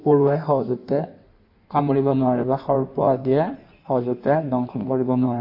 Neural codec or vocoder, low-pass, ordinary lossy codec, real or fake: codec, 16 kHz in and 24 kHz out, 1.1 kbps, FireRedTTS-2 codec; 5.4 kHz; MP3, 32 kbps; fake